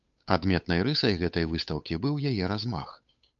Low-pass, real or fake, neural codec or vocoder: 7.2 kHz; fake; codec, 16 kHz, 8 kbps, FunCodec, trained on Chinese and English, 25 frames a second